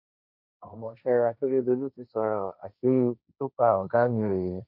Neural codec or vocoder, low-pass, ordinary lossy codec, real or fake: codec, 16 kHz, 1.1 kbps, Voila-Tokenizer; 5.4 kHz; none; fake